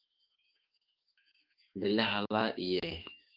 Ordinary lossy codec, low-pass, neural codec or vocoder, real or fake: Opus, 32 kbps; 5.4 kHz; autoencoder, 48 kHz, 32 numbers a frame, DAC-VAE, trained on Japanese speech; fake